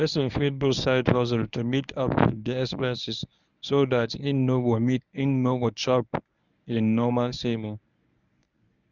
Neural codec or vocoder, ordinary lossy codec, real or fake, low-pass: codec, 24 kHz, 0.9 kbps, WavTokenizer, medium speech release version 1; none; fake; 7.2 kHz